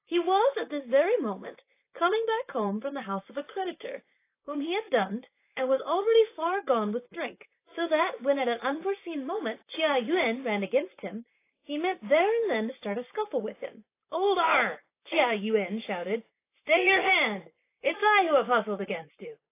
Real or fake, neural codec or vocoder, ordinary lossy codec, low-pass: fake; vocoder, 44.1 kHz, 128 mel bands, Pupu-Vocoder; AAC, 24 kbps; 3.6 kHz